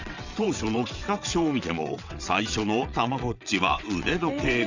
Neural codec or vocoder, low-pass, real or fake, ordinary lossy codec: vocoder, 22.05 kHz, 80 mel bands, WaveNeXt; 7.2 kHz; fake; Opus, 64 kbps